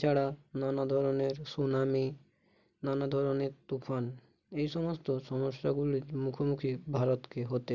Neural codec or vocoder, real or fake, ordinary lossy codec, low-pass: none; real; none; 7.2 kHz